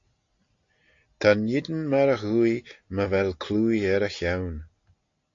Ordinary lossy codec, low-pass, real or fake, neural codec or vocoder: AAC, 48 kbps; 7.2 kHz; real; none